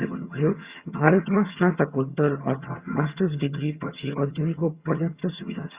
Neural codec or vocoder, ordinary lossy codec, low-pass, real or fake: vocoder, 22.05 kHz, 80 mel bands, HiFi-GAN; Opus, 64 kbps; 3.6 kHz; fake